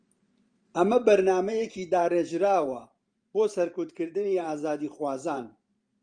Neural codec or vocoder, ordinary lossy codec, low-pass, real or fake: vocoder, 44.1 kHz, 128 mel bands every 512 samples, BigVGAN v2; Opus, 32 kbps; 9.9 kHz; fake